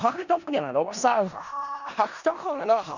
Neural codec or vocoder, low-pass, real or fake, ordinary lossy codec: codec, 16 kHz in and 24 kHz out, 0.4 kbps, LongCat-Audio-Codec, four codebook decoder; 7.2 kHz; fake; none